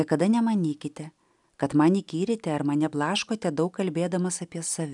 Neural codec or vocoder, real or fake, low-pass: none; real; 10.8 kHz